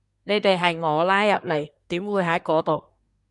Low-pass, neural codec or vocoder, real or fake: 10.8 kHz; codec, 24 kHz, 1 kbps, SNAC; fake